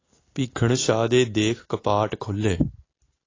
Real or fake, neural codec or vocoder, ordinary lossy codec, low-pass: real; none; AAC, 32 kbps; 7.2 kHz